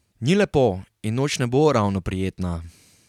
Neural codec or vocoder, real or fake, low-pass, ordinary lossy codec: none; real; 19.8 kHz; none